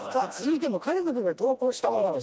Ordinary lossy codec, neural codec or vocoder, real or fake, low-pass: none; codec, 16 kHz, 1 kbps, FreqCodec, smaller model; fake; none